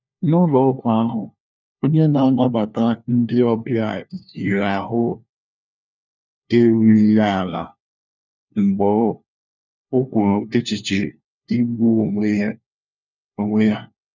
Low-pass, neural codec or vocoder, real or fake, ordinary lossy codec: 7.2 kHz; codec, 16 kHz, 1 kbps, FunCodec, trained on LibriTTS, 50 frames a second; fake; none